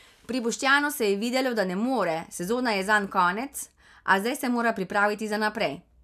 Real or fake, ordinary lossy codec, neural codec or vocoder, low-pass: real; none; none; 14.4 kHz